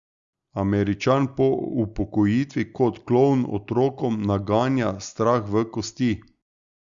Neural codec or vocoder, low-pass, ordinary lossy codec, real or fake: none; 7.2 kHz; Opus, 64 kbps; real